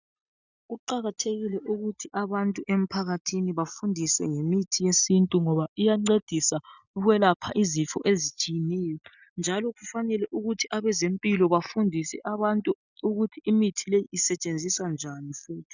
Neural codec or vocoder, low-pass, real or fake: none; 7.2 kHz; real